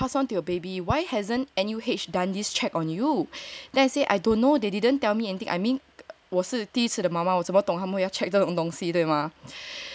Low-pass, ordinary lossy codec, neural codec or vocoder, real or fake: none; none; none; real